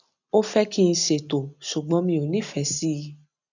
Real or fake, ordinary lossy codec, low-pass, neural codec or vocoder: real; none; 7.2 kHz; none